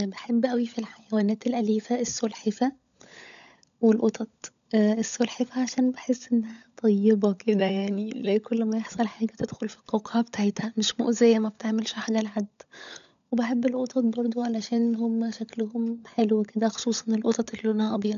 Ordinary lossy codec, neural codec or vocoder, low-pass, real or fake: none; codec, 16 kHz, 16 kbps, FunCodec, trained on LibriTTS, 50 frames a second; 7.2 kHz; fake